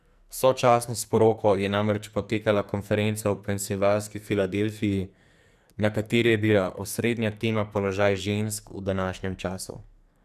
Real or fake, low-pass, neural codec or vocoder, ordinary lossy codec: fake; 14.4 kHz; codec, 44.1 kHz, 2.6 kbps, SNAC; none